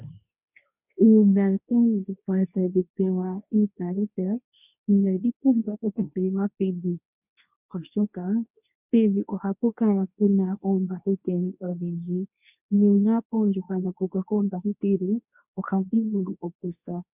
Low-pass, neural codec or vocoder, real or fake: 3.6 kHz; codec, 24 kHz, 0.9 kbps, WavTokenizer, medium speech release version 2; fake